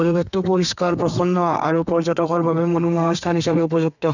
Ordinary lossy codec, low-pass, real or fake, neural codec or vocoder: none; 7.2 kHz; fake; codec, 32 kHz, 1.9 kbps, SNAC